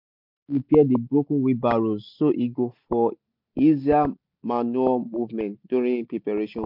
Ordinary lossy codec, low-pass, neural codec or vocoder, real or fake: MP3, 48 kbps; 5.4 kHz; none; real